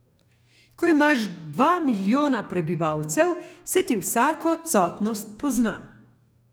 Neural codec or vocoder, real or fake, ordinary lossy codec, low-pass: codec, 44.1 kHz, 2.6 kbps, DAC; fake; none; none